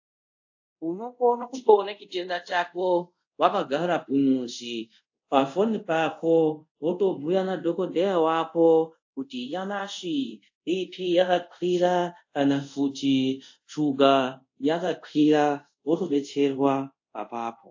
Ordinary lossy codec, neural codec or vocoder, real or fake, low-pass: AAC, 48 kbps; codec, 24 kHz, 0.5 kbps, DualCodec; fake; 7.2 kHz